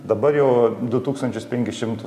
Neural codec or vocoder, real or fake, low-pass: none; real; 14.4 kHz